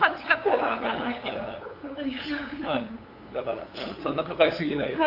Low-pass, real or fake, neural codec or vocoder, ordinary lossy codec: 5.4 kHz; fake; codec, 16 kHz, 8 kbps, FunCodec, trained on LibriTTS, 25 frames a second; AAC, 32 kbps